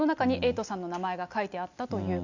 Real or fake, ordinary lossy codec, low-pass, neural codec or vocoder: real; none; 7.2 kHz; none